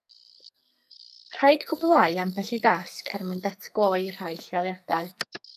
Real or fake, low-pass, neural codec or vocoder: fake; 14.4 kHz; codec, 44.1 kHz, 2.6 kbps, SNAC